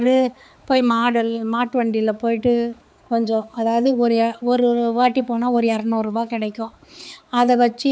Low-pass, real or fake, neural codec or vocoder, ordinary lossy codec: none; fake; codec, 16 kHz, 4 kbps, X-Codec, HuBERT features, trained on balanced general audio; none